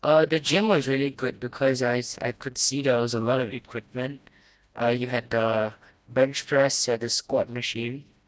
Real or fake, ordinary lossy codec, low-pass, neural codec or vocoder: fake; none; none; codec, 16 kHz, 1 kbps, FreqCodec, smaller model